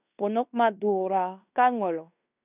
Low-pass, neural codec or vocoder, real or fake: 3.6 kHz; codec, 16 kHz in and 24 kHz out, 0.9 kbps, LongCat-Audio-Codec, fine tuned four codebook decoder; fake